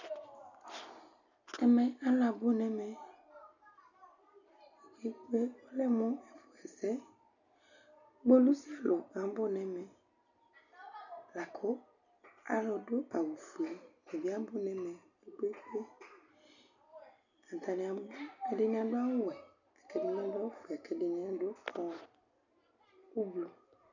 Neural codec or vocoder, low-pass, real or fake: none; 7.2 kHz; real